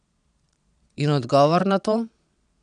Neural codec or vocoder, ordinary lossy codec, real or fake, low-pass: vocoder, 22.05 kHz, 80 mel bands, Vocos; none; fake; 9.9 kHz